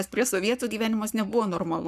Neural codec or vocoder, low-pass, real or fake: codec, 44.1 kHz, 7.8 kbps, Pupu-Codec; 14.4 kHz; fake